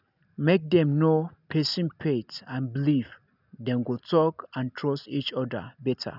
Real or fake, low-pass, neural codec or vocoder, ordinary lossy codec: real; 5.4 kHz; none; none